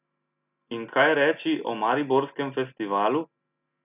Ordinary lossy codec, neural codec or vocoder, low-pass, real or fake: none; none; 3.6 kHz; real